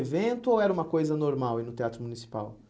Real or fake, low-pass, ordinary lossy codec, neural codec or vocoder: real; none; none; none